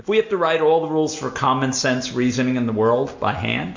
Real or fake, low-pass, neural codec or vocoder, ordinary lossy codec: real; 7.2 kHz; none; MP3, 48 kbps